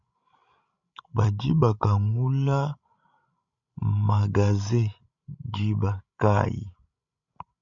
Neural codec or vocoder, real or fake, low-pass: codec, 16 kHz, 16 kbps, FreqCodec, larger model; fake; 7.2 kHz